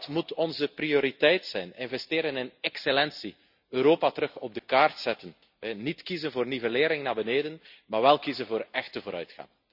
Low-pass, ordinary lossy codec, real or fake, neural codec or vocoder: 5.4 kHz; none; real; none